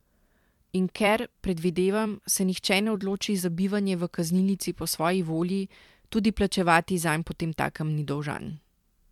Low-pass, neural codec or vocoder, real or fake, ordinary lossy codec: 19.8 kHz; vocoder, 44.1 kHz, 128 mel bands every 256 samples, BigVGAN v2; fake; MP3, 96 kbps